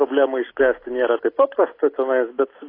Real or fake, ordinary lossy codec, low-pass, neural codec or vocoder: real; AAC, 24 kbps; 5.4 kHz; none